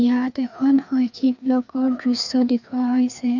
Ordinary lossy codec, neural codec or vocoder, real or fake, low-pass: none; codec, 16 kHz, 2 kbps, FreqCodec, larger model; fake; 7.2 kHz